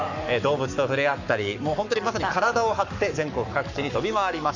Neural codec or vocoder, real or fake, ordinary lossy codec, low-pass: codec, 44.1 kHz, 7.8 kbps, Pupu-Codec; fake; none; 7.2 kHz